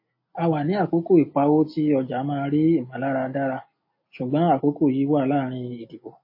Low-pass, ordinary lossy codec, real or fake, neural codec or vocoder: 5.4 kHz; MP3, 24 kbps; real; none